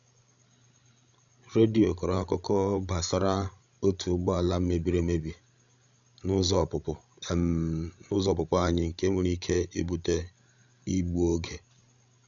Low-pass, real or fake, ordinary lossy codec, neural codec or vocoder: 7.2 kHz; fake; none; codec, 16 kHz, 16 kbps, FreqCodec, larger model